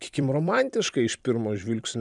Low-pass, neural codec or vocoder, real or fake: 10.8 kHz; vocoder, 44.1 kHz, 128 mel bands every 256 samples, BigVGAN v2; fake